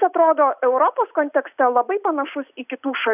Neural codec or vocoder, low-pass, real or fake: none; 3.6 kHz; real